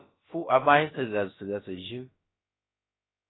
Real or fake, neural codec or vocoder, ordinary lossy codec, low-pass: fake; codec, 16 kHz, about 1 kbps, DyCAST, with the encoder's durations; AAC, 16 kbps; 7.2 kHz